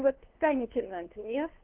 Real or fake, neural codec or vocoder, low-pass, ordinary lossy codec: fake; codec, 16 kHz, 2 kbps, FunCodec, trained on LibriTTS, 25 frames a second; 3.6 kHz; Opus, 16 kbps